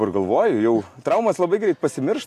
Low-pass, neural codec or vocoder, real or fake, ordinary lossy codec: 14.4 kHz; none; real; AAC, 64 kbps